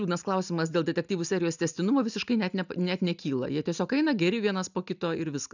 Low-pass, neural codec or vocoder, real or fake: 7.2 kHz; none; real